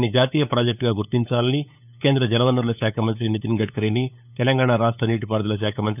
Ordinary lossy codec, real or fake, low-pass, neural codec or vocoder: none; fake; 3.6 kHz; codec, 16 kHz, 8 kbps, FunCodec, trained on LibriTTS, 25 frames a second